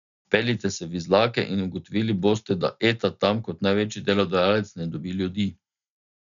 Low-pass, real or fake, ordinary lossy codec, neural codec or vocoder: 7.2 kHz; real; none; none